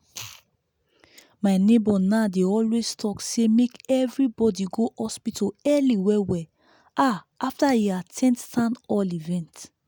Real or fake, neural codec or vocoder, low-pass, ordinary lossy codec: real; none; none; none